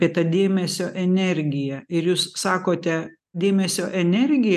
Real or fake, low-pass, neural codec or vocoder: real; 14.4 kHz; none